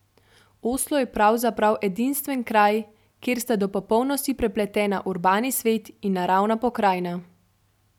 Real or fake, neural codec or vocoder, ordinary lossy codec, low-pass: real; none; none; 19.8 kHz